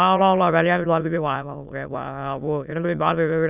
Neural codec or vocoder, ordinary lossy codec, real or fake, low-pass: autoencoder, 22.05 kHz, a latent of 192 numbers a frame, VITS, trained on many speakers; none; fake; 3.6 kHz